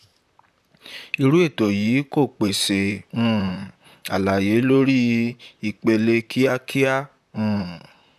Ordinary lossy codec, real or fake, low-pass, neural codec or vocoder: none; fake; 14.4 kHz; vocoder, 44.1 kHz, 128 mel bands, Pupu-Vocoder